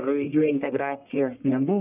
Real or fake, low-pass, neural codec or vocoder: fake; 3.6 kHz; codec, 44.1 kHz, 1.7 kbps, Pupu-Codec